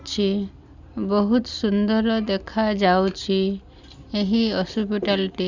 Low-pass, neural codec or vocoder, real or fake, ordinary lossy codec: 7.2 kHz; none; real; Opus, 64 kbps